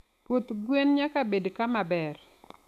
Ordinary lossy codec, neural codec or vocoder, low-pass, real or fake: none; autoencoder, 48 kHz, 128 numbers a frame, DAC-VAE, trained on Japanese speech; 14.4 kHz; fake